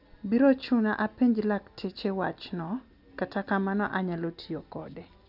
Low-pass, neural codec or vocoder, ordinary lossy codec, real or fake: 5.4 kHz; none; none; real